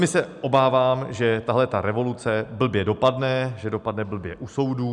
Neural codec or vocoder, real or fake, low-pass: none; real; 10.8 kHz